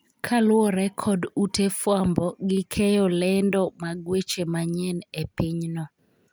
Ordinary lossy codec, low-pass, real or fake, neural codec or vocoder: none; none; real; none